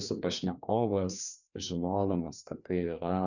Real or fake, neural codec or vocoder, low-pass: fake; codec, 16 kHz, 2 kbps, FreqCodec, larger model; 7.2 kHz